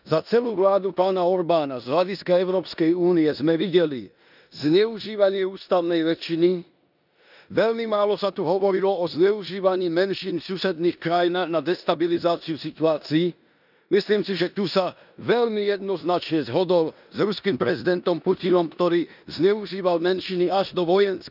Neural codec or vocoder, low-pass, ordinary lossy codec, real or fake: codec, 16 kHz in and 24 kHz out, 0.9 kbps, LongCat-Audio-Codec, four codebook decoder; 5.4 kHz; none; fake